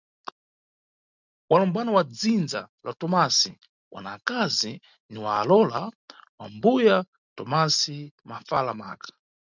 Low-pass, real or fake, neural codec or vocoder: 7.2 kHz; real; none